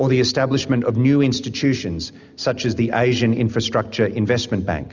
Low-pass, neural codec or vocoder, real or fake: 7.2 kHz; none; real